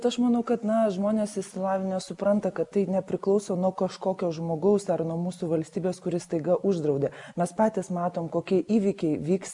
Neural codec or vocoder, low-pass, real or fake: none; 10.8 kHz; real